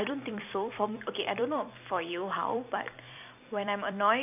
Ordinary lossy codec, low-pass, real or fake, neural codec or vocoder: none; 3.6 kHz; fake; vocoder, 44.1 kHz, 128 mel bands every 256 samples, BigVGAN v2